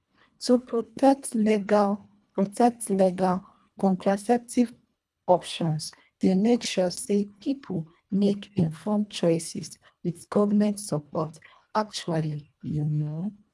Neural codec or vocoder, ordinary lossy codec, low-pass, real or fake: codec, 24 kHz, 1.5 kbps, HILCodec; none; none; fake